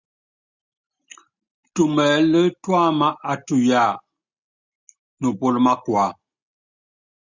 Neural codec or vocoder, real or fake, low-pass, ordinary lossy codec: none; real; 7.2 kHz; Opus, 64 kbps